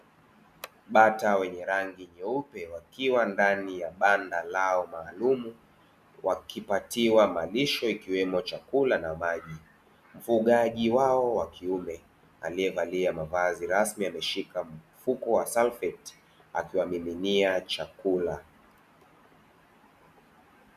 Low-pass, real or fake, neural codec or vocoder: 14.4 kHz; real; none